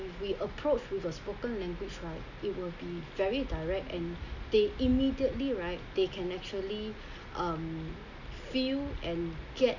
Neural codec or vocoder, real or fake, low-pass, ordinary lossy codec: none; real; 7.2 kHz; none